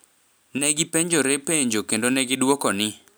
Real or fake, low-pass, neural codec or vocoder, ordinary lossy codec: real; none; none; none